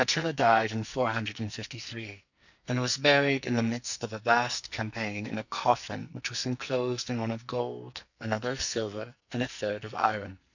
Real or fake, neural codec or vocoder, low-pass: fake; codec, 44.1 kHz, 2.6 kbps, SNAC; 7.2 kHz